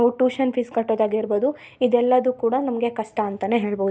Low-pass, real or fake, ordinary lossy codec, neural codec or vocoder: none; real; none; none